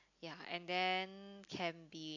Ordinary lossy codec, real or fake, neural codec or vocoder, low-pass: AAC, 48 kbps; real; none; 7.2 kHz